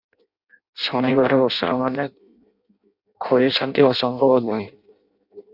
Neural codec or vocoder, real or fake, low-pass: codec, 16 kHz in and 24 kHz out, 0.6 kbps, FireRedTTS-2 codec; fake; 5.4 kHz